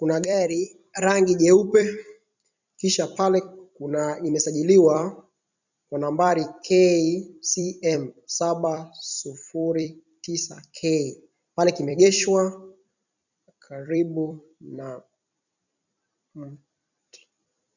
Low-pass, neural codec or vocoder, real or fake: 7.2 kHz; vocoder, 44.1 kHz, 128 mel bands every 256 samples, BigVGAN v2; fake